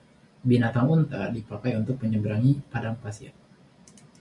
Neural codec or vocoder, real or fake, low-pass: none; real; 10.8 kHz